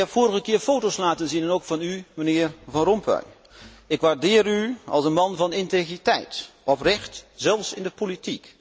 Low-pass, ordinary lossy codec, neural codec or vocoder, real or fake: none; none; none; real